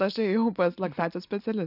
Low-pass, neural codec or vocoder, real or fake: 5.4 kHz; none; real